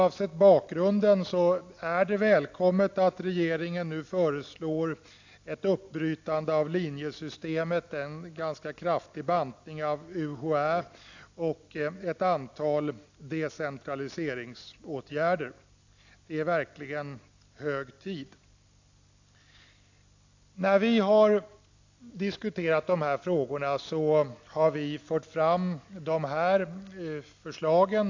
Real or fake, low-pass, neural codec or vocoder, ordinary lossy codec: real; 7.2 kHz; none; AAC, 48 kbps